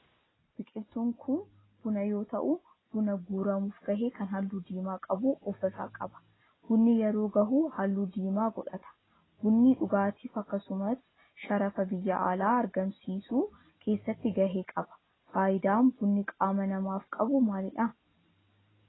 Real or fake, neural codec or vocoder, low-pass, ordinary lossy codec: real; none; 7.2 kHz; AAC, 16 kbps